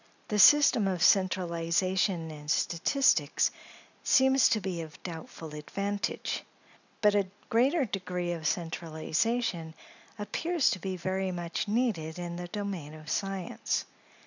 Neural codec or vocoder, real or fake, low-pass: none; real; 7.2 kHz